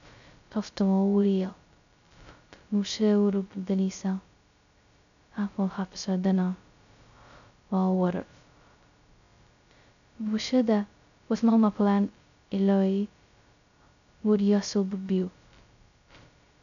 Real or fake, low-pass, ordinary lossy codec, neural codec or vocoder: fake; 7.2 kHz; none; codec, 16 kHz, 0.2 kbps, FocalCodec